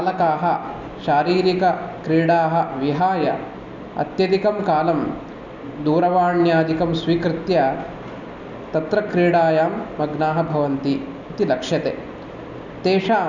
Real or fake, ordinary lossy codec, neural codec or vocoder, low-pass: real; none; none; 7.2 kHz